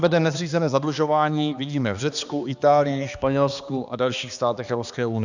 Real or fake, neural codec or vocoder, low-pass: fake; codec, 16 kHz, 2 kbps, X-Codec, HuBERT features, trained on balanced general audio; 7.2 kHz